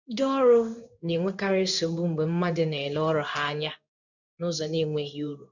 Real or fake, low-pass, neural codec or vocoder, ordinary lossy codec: fake; 7.2 kHz; codec, 16 kHz in and 24 kHz out, 1 kbps, XY-Tokenizer; none